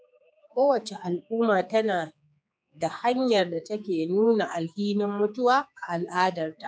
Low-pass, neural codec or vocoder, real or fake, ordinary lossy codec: none; codec, 16 kHz, 4 kbps, X-Codec, HuBERT features, trained on general audio; fake; none